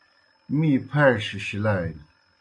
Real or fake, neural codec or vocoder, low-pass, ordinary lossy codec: real; none; 9.9 kHz; AAC, 48 kbps